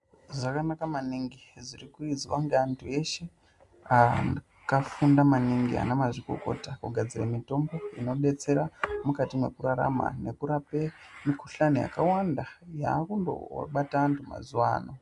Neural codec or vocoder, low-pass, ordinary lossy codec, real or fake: none; 10.8 kHz; MP3, 96 kbps; real